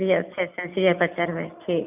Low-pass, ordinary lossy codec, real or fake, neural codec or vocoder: 3.6 kHz; none; real; none